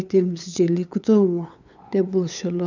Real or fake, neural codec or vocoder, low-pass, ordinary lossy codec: fake; codec, 16 kHz, 8 kbps, FunCodec, trained on LibriTTS, 25 frames a second; 7.2 kHz; none